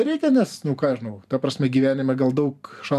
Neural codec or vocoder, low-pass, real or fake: vocoder, 44.1 kHz, 128 mel bands every 512 samples, BigVGAN v2; 14.4 kHz; fake